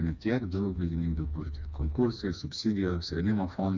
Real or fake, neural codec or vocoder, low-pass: fake; codec, 16 kHz, 2 kbps, FreqCodec, smaller model; 7.2 kHz